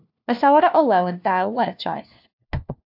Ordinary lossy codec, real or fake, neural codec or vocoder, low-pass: AAC, 48 kbps; fake; codec, 16 kHz, 1 kbps, FunCodec, trained on LibriTTS, 50 frames a second; 5.4 kHz